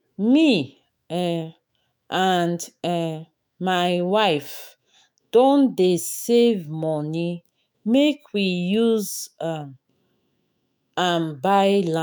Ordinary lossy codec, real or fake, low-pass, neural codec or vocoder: none; fake; none; autoencoder, 48 kHz, 128 numbers a frame, DAC-VAE, trained on Japanese speech